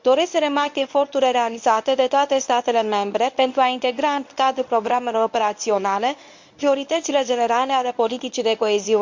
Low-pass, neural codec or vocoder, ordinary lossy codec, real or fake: 7.2 kHz; codec, 24 kHz, 0.9 kbps, WavTokenizer, medium speech release version 1; MP3, 64 kbps; fake